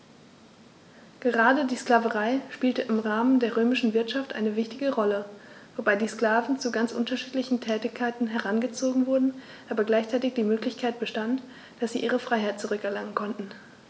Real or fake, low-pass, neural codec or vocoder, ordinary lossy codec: real; none; none; none